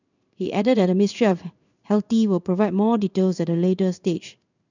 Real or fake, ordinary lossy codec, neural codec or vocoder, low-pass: fake; none; codec, 16 kHz in and 24 kHz out, 1 kbps, XY-Tokenizer; 7.2 kHz